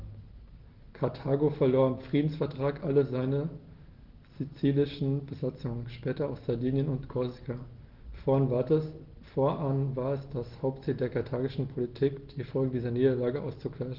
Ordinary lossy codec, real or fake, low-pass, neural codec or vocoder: Opus, 16 kbps; real; 5.4 kHz; none